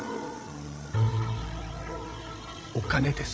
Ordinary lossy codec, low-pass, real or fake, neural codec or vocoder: none; none; fake; codec, 16 kHz, 16 kbps, FreqCodec, larger model